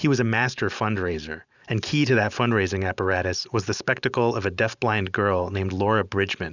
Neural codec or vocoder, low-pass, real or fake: vocoder, 44.1 kHz, 128 mel bands every 512 samples, BigVGAN v2; 7.2 kHz; fake